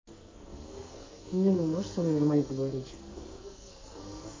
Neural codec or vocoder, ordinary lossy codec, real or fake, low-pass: codec, 44.1 kHz, 2.6 kbps, SNAC; MP3, 64 kbps; fake; 7.2 kHz